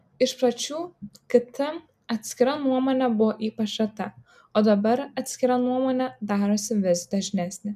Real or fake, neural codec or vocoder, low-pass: real; none; 14.4 kHz